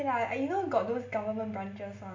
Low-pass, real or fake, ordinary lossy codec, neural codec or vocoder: 7.2 kHz; real; MP3, 48 kbps; none